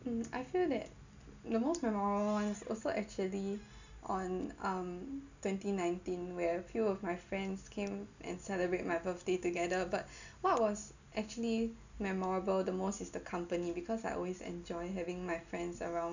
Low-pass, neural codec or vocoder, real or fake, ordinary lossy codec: 7.2 kHz; none; real; none